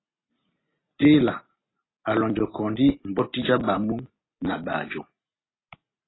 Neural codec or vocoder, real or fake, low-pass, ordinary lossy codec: none; real; 7.2 kHz; AAC, 16 kbps